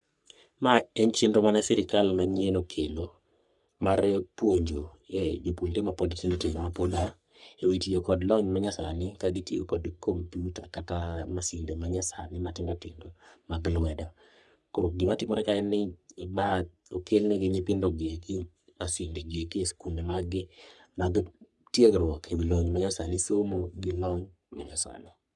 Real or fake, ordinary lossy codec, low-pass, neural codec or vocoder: fake; none; 10.8 kHz; codec, 44.1 kHz, 3.4 kbps, Pupu-Codec